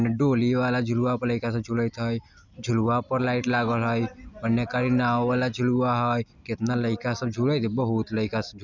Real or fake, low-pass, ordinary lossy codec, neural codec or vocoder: real; 7.2 kHz; none; none